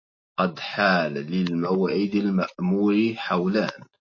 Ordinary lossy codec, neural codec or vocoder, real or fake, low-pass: MP3, 32 kbps; none; real; 7.2 kHz